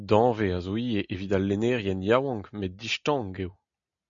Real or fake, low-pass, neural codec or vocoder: real; 7.2 kHz; none